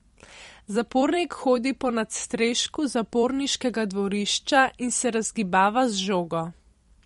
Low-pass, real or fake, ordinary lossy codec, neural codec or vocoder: 19.8 kHz; fake; MP3, 48 kbps; vocoder, 44.1 kHz, 128 mel bands every 256 samples, BigVGAN v2